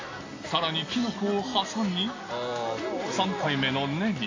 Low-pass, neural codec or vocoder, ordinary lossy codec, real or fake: 7.2 kHz; none; AAC, 32 kbps; real